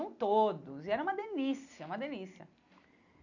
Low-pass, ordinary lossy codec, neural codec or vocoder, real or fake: 7.2 kHz; none; none; real